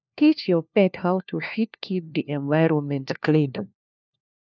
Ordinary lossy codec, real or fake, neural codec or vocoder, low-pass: none; fake; codec, 16 kHz, 1 kbps, FunCodec, trained on LibriTTS, 50 frames a second; 7.2 kHz